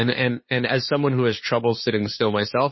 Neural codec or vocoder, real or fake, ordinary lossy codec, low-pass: codec, 16 kHz, 2 kbps, FunCodec, trained on LibriTTS, 25 frames a second; fake; MP3, 24 kbps; 7.2 kHz